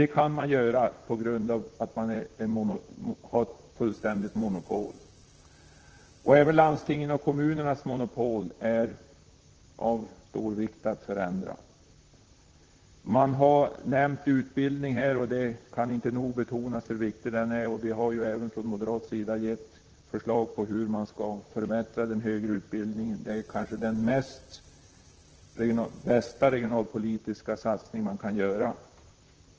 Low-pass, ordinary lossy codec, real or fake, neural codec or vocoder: 7.2 kHz; Opus, 16 kbps; fake; vocoder, 44.1 kHz, 128 mel bands, Pupu-Vocoder